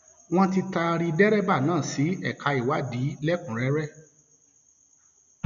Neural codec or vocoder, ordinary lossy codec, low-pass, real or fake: none; none; 7.2 kHz; real